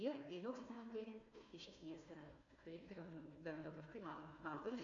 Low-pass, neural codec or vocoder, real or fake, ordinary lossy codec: 7.2 kHz; codec, 16 kHz, 1 kbps, FunCodec, trained on Chinese and English, 50 frames a second; fake; AAC, 64 kbps